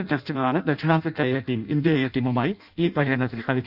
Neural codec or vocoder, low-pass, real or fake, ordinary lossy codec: codec, 16 kHz in and 24 kHz out, 0.6 kbps, FireRedTTS-2 codec; 5.4 kHz; fake; none